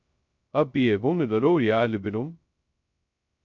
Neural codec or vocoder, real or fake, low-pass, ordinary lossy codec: codec, 16 kHz, 0.2 kbps, FocalCodec; fake; 7.2 kHz; MP3, 48 kbps